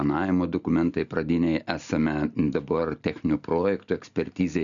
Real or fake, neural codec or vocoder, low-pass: real; none; 7.2 kHz